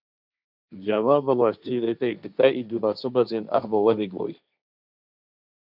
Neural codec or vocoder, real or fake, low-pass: codec, 16 kHz, 1.1 kbps, Voila-Tokenizer; fake; 5.4 kHz